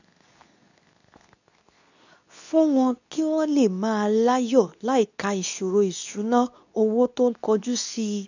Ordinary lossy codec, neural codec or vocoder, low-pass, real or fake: none; codec, 24 kHz, 0.9 kbps, WavTokenizer, medium speech release version 2; 7.2 kHz; fake